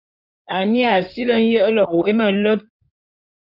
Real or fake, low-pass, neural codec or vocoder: fake; 5.4 kHz; codec, 16 kHz in and 24 kHz out, 2.2 kbps, FireRedTTS-2 codec